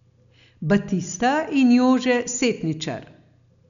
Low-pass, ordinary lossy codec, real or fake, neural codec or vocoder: 7.2 kHz; none; real; none